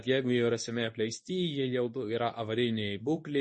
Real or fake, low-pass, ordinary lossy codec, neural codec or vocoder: fake; 10.8 kHz; MP3, 32 kbps; codec, 24 kHz, 0.9 kbps, WavTokenizer, medium speech release version 2